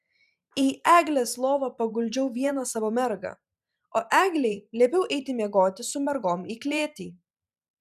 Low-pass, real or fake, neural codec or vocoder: 14.4 kHz; fake; vocoder, 44.1 kHz, 128 mel bands every 512 samples, BigVGAN v2